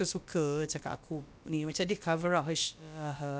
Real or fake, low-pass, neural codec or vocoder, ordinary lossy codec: fake; none; codec, 16 kHz, about 1 kbps, DyCAST, with the encoder's durations; none